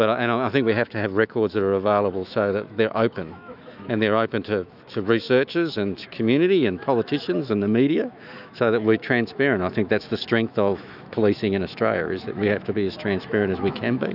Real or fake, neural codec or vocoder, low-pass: fake; autoencoder, 48 kHz, 128 numbers a frame, DAC-VAE, trained on Japanese speech; 5.4 kHz